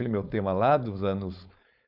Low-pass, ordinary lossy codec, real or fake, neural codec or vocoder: 5.4 kHz; Opus, 64 kbps; fake; codec, 16 kHz, 4.8 kbps, FACodec